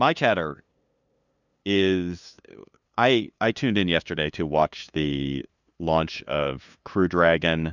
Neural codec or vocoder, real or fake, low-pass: codec, 16 kHz, 2 kbps, FunCodec, trained on LibriTTS, 25 frames a second; fake; 7.2 kHz